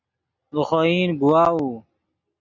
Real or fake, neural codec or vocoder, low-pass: real; none; 7.2 kHz